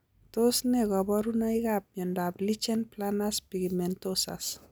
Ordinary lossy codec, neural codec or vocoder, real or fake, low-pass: none; none; real; none